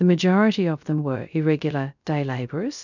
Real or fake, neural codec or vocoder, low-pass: fake; codec, 16 kHz, 0.3 kbps, FocalCodec; 7.2 kHz